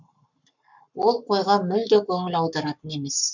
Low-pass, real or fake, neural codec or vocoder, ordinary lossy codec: 7.2 kHz; fake; codec, 44.1 kHz, 7.8 kbps, Pupu-Codec; MP3, 64 kbps